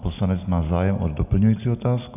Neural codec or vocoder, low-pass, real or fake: none; 3.6 kHz; real